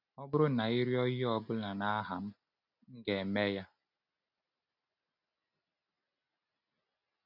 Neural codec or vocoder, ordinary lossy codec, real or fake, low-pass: none; none; real; 5.4 kHz